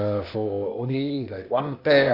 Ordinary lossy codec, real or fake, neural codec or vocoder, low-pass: Opus, 64 kbps; fake; codec, 16 kHz, 0.8 kbps, ZipCodec; 5.4 kHz